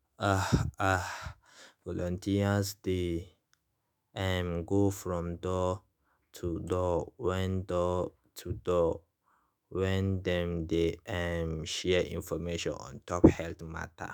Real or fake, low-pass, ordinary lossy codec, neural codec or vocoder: fake; none; none; autoencoder, 48 kHz, 128 numbers a frame, DAC-VAE, trained on Japanese speech